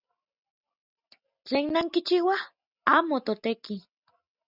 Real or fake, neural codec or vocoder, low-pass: real; none; 5.4 kHz